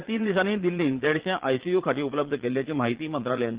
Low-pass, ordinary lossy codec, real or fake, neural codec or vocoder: 3.6 kHz; Opus, 16 kbps; fake; vocoder, 22.05 kHz, 80 mel bands, Vocos